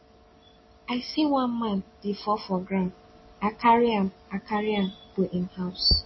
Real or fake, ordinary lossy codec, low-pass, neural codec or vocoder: real; MP3, 24 kbps; 7.2 kHz; none